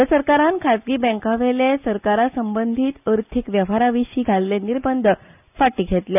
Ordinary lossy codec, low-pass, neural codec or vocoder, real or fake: none; 3.6 kHz; none; real